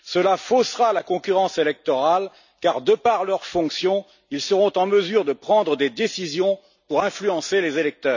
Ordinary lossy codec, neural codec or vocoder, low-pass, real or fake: none; none; 7.2 kHz; real